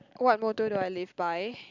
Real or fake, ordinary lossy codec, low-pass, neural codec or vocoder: real; Opus, 64 kbps; 7.2 kHz; none